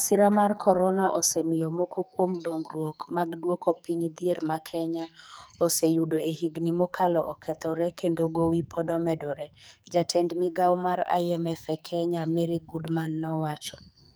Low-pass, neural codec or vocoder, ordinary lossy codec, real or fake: none; codec, 44.1 kHz, 2.6 kbps, SNAC; none; fake